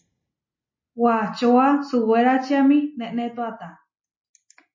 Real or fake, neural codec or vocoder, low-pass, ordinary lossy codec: real; none; 7.2 kHz; MP3, 32 kbps